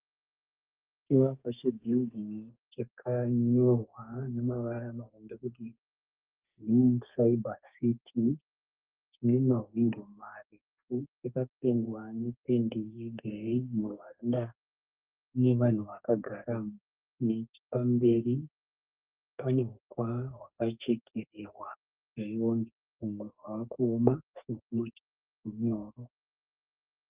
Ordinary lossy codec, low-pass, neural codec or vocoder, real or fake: Opus, 32 kbps; 3.6 kHz; codec, 32 kHz, 1.9 kbps, SNAC; fake